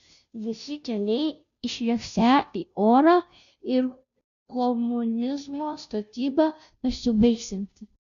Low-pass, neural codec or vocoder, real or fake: 7.2 kHz; codec, 16 kHz, 0.5 kbps, FunCodec, trained on Chinese and English, 25 frames a second; fake